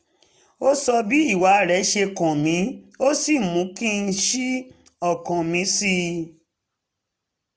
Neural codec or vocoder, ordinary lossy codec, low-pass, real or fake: none; none; none; real